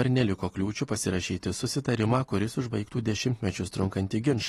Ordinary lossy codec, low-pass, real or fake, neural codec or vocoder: AAC, 32 kbps; 14.4 kHz; real; none